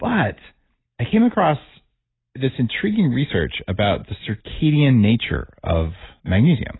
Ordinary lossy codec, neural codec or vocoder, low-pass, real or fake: AAC, 16 kbps; none; 7.2 kHz; real